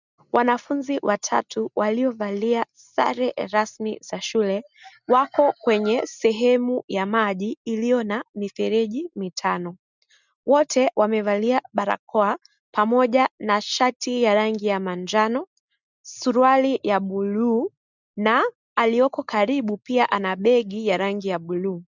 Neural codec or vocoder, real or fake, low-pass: none; real; 7.2 kHz